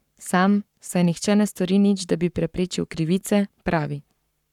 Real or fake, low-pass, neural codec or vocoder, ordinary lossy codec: fake; 19.8 kHz; codec, 44.1 kHz, 7.8 kbps, Pupu-Codec; none